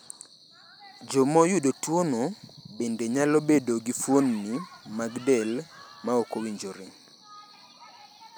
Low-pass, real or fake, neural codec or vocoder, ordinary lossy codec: none; real; none; none